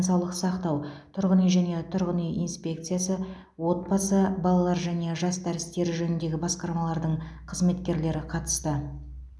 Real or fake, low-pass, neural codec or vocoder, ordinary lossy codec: real; none; none; none